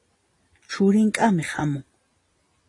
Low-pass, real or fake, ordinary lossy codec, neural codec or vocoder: 10.8 kHz; real; AAC, 32 kbps; none